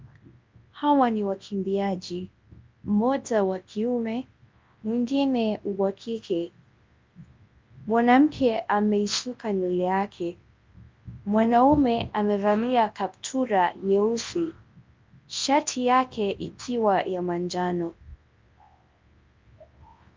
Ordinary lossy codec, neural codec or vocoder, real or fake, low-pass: Opus, 32 kbps; codec, 24 kHz, 0.9 kbps, WavTokenizer, large speech release; fake; 7.2 kHz